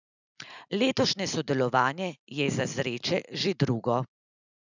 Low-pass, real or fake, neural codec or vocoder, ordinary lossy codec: 7.2 kHz; real; none; none